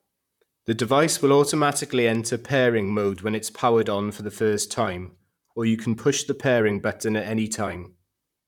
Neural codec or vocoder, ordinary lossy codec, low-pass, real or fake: vocoder, 44.1 kHz, 128 mel bands, Pupu-Vocoder; none; 19.8 kHz; fake